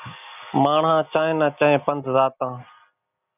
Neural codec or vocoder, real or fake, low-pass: none; real; 3.6 kHz